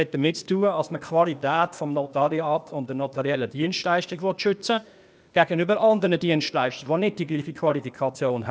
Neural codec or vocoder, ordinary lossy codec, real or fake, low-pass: codec, 16 kHz, 0.8 kbps, ZipCodec; none; fake; none